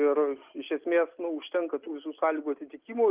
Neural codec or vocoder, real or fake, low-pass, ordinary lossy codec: none; real; 3.6 kHz; Opus, 32 kbps